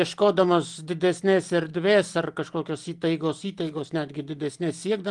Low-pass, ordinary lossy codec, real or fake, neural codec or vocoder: 10.8 kHz; Opus, 24 kbps; real; none